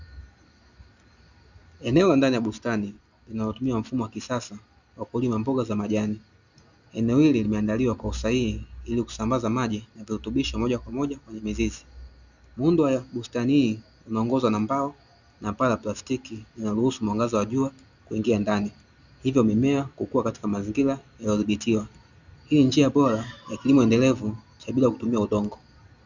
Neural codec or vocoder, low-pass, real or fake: none; 7.2 kHz; real